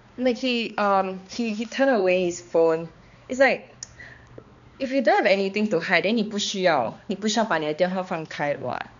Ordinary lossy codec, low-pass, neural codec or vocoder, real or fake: none; 7.2 kHz; codec, 16 kHz, 2 kbps, X-Codec, HuBERT features, trained on balanced general audio; fake